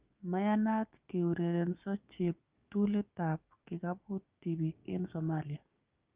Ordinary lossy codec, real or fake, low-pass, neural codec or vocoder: Opus, 24 kbps; fake; 3.6 kHz; codec, 16 kHz, 6 kbps, DAC